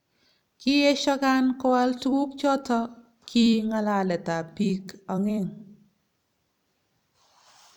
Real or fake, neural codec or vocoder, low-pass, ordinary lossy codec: fake; vocoder, 44.1 kHz, 128 mel bands every 256 samples, BigVGAN v2; 19.8 kHz; none